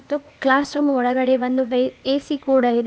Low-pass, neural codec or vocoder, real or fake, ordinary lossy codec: none; codec, 16 kHz, 0.8 kbps, ZipCodec; fake; none